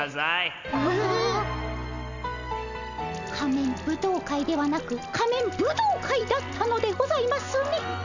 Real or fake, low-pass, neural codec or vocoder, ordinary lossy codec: real; 7.2 kHz; none; none